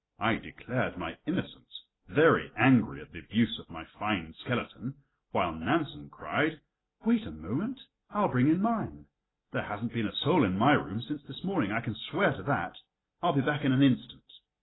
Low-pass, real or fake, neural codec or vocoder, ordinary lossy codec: 7.2 kHz; real; none; AAC, 16 kbps